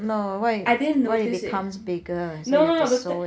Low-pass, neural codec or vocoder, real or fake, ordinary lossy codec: none; none; real; none